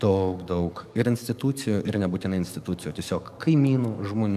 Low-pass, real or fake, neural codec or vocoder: 14.4 kHz; fake; codec, 44.1 kHz, 7.8 kbps, DAC